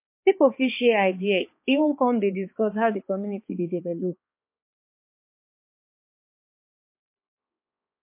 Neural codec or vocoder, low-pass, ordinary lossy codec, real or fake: codec, 16 kHz, 4 kbps, X-Codec, HuBERT features, trained on balanced general audio; 3.6 kHz; MP3, 24 kbps; fake